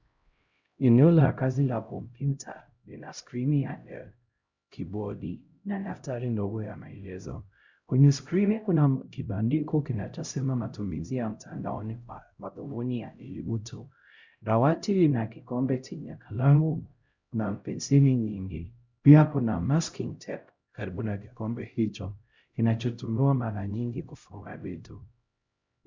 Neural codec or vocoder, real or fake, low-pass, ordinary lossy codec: codec, 16 kHz, 0.5 kbps, X-Codec, HuBERT features, trained on LibriSpeech; fake; 7.2 kHz; Opus, 64 kbps